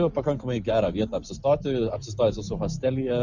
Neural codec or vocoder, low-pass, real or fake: none; 7.2 kHz; real